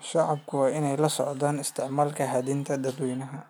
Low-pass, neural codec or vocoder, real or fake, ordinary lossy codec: none; none; real; none